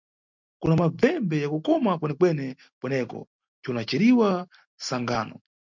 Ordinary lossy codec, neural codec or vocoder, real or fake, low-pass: MP3, 64 kbps; none; real; 7.2 kHz